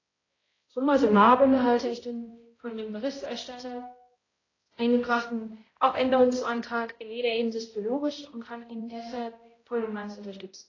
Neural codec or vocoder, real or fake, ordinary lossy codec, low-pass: codec, 16 kHz, 0.5 kbps, X-Codec, HuBERT features, trained on balanced general audio; fake; AAC, 32 kbps; 7.2 kHz